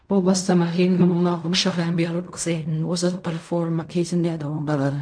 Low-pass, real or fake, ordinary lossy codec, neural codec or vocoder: 9.9 kHz; fake; none; codec, 16 kHz in and 24 kHz out, 0.4 kbps, LongCat-Audio-Codec, fine tuned four codebook decoder